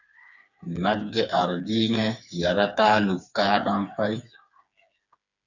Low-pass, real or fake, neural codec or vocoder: 7.2 kHz; fake; codec, 16 kHz, 4 kbps, FreqCodec, smaller model